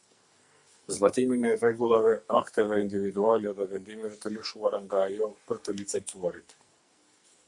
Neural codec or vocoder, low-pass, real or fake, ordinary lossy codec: codec, 44.1 kHz, 2.6 kbps, SNAC; 10.8 kHz; fake; Opus, 64 kbps